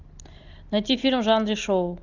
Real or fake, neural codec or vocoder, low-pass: real; none; 7.2 kHz